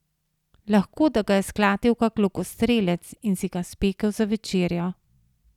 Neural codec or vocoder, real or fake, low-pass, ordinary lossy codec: none; real; 19.8 kHz; none